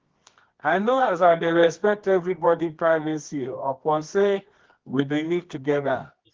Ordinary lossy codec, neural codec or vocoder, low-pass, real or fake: Opus, 16 kbps; codec, 24 kHz, 0.9 kbps, WavTokenizer, medium music audio release; 7.2 kHz; fake